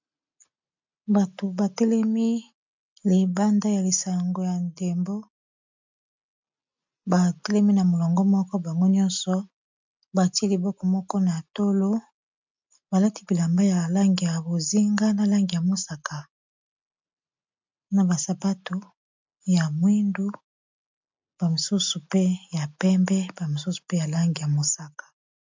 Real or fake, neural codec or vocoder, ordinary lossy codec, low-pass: real; none; MP3, 64 kbps; 7.2 kHz